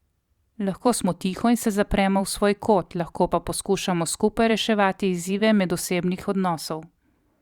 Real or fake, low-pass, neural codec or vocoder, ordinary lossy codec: fake; 19.8 kHz; vocoder, 44.1 kHz, 128 mel bands every 512 samples, BigVGAN v2; Opus, 64 kbps